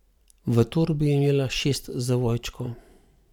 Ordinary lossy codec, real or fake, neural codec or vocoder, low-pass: none; fake; vocoder, 44.1 kHz, 128 mel bands every 512 samples, BigVGAN v2; 19.8 kHz